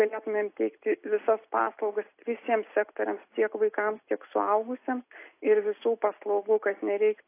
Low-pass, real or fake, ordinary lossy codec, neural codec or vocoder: 3.6 kHz; real; AAC, 24 kbps; none